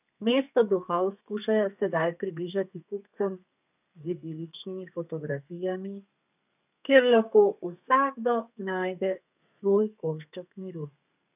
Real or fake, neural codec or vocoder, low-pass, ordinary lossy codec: fake; codec, 32 kHz, 1.9 kbps, SNAC; 3.6 kHz; none